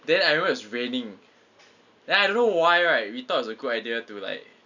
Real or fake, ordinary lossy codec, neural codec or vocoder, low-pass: real; none; none; 7.2 kHz